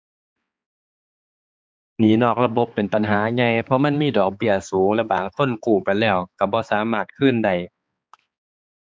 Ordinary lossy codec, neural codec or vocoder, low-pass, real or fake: none; codec, 16 kHz, 4 kbps, X-Codec, HuBERT features, trained on balanced general audio; none; fake